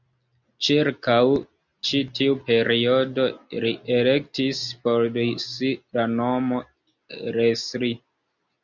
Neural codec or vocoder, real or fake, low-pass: none; real; 7.2 kHz